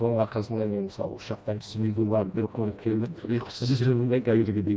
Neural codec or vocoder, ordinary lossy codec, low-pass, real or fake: codec, 16 kHz, 1 kbps, FreqCodec, smaller model; none; none; fake